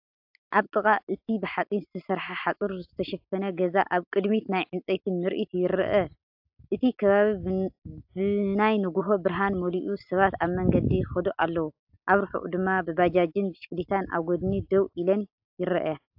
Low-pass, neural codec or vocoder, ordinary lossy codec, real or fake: 5.4 kHz; none; AAC, 48 kbps; real